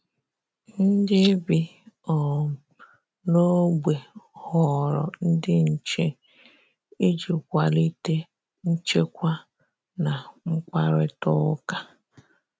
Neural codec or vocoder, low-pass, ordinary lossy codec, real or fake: none; none; none; real